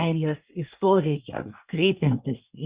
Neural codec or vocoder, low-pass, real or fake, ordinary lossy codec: codec, 24 kHz, 1 kbps, SNAC; 3.6 kHz; fake; Opus, 64 kbps